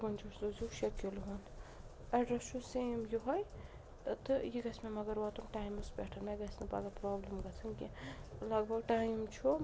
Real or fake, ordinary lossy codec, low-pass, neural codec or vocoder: real; none; none; none